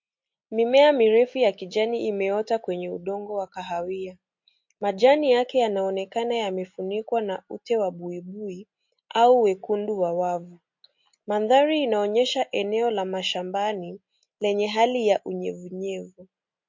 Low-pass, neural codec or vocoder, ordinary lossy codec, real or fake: 7.2 kHz; none; MP3, 48 kbps; real